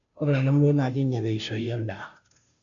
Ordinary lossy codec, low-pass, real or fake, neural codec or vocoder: none; 7.2 kHz; fake; codec, 16 kHz, 0.5 kbps, FunCodec, trained on Chinese and English, 25 frames a second